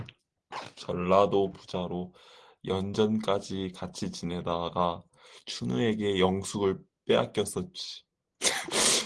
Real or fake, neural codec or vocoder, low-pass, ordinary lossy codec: real; none; 9.9 kHz; Opus, 16 kbps